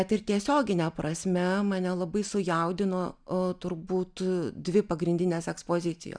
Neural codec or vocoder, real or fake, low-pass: none; real; 9.9 kHz